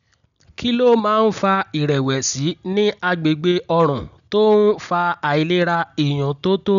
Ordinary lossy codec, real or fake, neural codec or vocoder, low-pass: none; real; none; 7.2 kHz